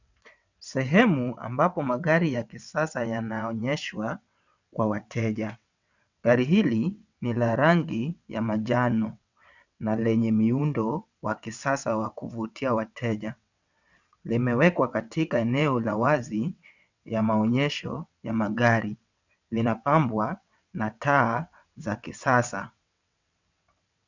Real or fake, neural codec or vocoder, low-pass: fake; vocoder, 22.05 kHz, 80 mel bands, WaveNeXt; 7.2 kHz